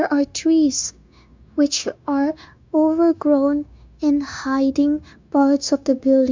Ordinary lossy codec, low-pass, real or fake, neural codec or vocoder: MP3, 64 kbps; 7.2 kHz; fake; codec, 16 kHz, 0.9 kbps, LongCat-Audio-Codec